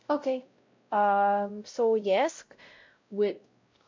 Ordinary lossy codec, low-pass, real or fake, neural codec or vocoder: MP3, 48 kbps; 7.2 kHz; fake; codec, 16 kHz, 0.5 kbps, X-Codec, WavLM features, trained on Multilingual LibriSpeech